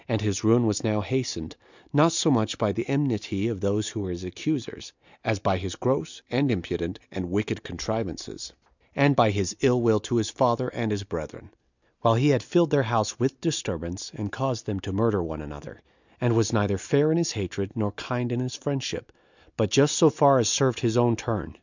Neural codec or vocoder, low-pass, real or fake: none; 7.2 kHz; real